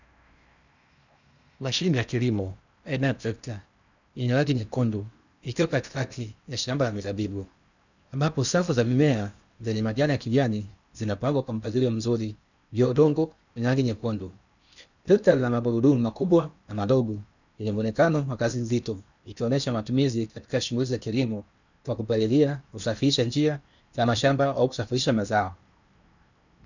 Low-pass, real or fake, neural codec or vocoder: 7.2 kHz; fake; codec, 16 kHz in and 24 kHz out, 0.8 kbps, FocalCodec, streaming, 65536 codes